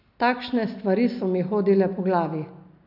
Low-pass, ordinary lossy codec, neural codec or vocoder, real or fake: 5.4 kHz; none; none; real